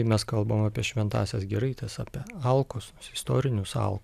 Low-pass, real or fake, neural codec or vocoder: 14.4 kHz; real; none